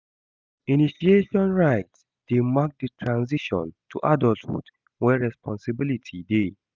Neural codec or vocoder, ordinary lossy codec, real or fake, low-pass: none; none; real; none